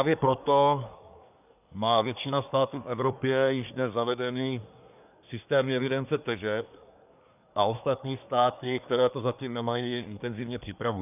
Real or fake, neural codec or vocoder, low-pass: fake; codec, 24 kHz, 1 kbps, SNAC; 3.6 kHz